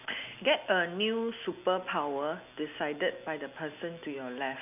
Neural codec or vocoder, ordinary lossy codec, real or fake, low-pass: none; none; real; 3.6 kHz